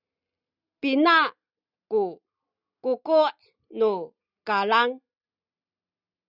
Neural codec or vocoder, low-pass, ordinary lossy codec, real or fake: none; 5.4 kHz; Opus, 64 kbps; real